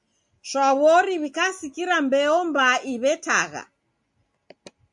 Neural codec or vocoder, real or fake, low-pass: none; real; 10.8 kHz